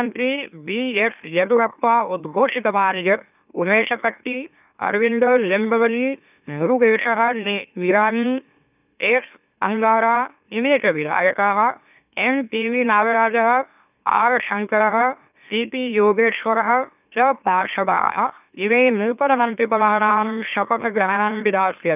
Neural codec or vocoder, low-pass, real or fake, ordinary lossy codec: autoencoder, 44.1 kHz, a latent of 192 numbers a frame, MeloTTS; 3.6 kHz; fake; none